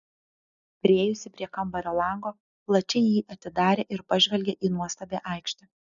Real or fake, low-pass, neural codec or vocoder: real; 7.2 kHz; none